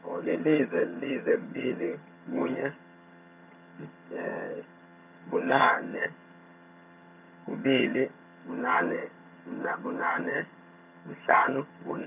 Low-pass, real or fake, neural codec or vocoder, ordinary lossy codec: 3.6 kHz; fake; vocoder, 22.05 kHz, 80 mel bands, HiFi-GAN; none